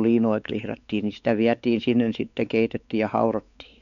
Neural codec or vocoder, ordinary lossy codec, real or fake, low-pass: codec, 16 kHz, 4 kbps, X-Codec, WavLM features, trained on Multilingual LibriSpeech; none; fake; 7.2 kHz